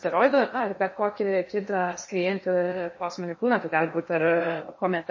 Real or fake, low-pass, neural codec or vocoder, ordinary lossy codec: fake; 7.2 kHz; codec, 16 kHz in and 24 kHz out, 0.8 kbps, FocalCodec, streaming, 65536 codes; MP3, 32 kbps